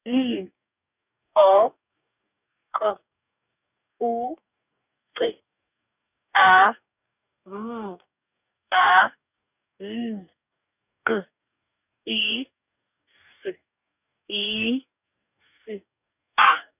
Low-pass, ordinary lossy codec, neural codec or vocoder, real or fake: 3.6 kHz; none; codec, 44.1 kHz, 2.6 kbps, DAC; fake